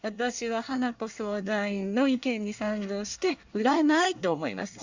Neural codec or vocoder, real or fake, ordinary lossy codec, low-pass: codec, 24 kHz, 1 kbps, SNAC; fake; Opus, 64 kbps; 7.2 kHz